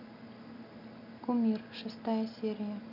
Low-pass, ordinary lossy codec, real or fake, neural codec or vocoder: 5.4 kHz; none; real; none